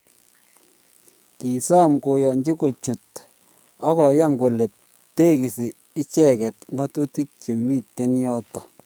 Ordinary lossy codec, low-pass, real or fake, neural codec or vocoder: none; none; fake; codec, 44.1 kHz, 2.6 kbps, SNAC